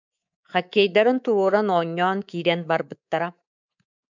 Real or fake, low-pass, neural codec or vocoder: fake; 7.2 kHz; codec, 24 kHz, 3.1 kbps, DualCodec